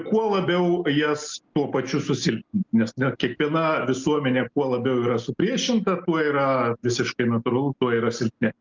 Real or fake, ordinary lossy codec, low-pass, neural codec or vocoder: real; Opus, 24 kbps; 7.2 kHz; none